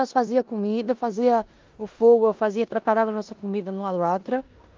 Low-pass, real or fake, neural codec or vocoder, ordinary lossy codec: 7.2 kHz; fake; codec, 16 kHz in and 24 kHz out, 0.9 kbps, LongCat-Audio-Codec, four codebook decoder; Opus, 32 kbps